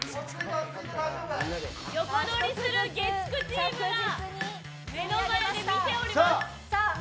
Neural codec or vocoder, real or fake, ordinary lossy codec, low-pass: none; real; none; none